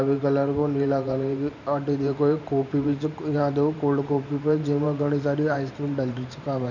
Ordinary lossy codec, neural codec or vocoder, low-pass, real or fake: none; vocoder, 44.1 kHz, 128 mel bands every 512 samples, BigVGAN v2; 7.2 kHz; fake